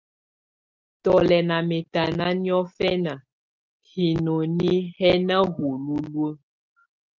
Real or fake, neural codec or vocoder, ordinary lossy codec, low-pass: real; none; Opus, 32 kbps; 7.2 kHz